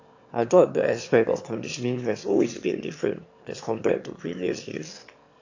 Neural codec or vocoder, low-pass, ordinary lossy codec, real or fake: autoencoder, 22.05 kHz, a latent of 192 numbers a frame, VITS, trained on one speaker; 7.2 kHz; AAC, 48 kbps; fake